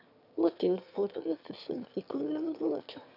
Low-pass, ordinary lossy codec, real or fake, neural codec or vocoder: 5.4 kHz; none; fake; autoencoder, 22.05 kHz, a latent of 192 numbers a frame, VITS, trained on one speaker